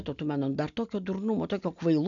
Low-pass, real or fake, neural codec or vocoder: 7.2 kHz; real; none